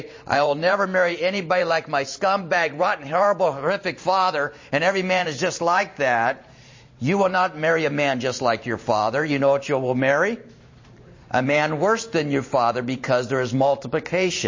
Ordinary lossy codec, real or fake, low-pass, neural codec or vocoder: MP3, 32 kbps; fake; 7.2 kHz; vocoder, 44.1 kHz, 128 mel bands every 512 samples, BigVGAN v2